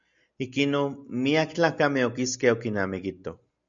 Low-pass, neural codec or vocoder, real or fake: 7.2 kHz; none; real